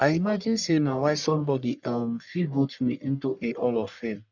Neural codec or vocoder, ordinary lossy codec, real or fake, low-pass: codec, 44.1 kHz, 1.7 kbps, Pupu-Codec; none; fake; 7.2 kHz